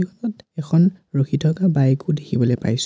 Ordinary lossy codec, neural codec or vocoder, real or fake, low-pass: none; none; real; none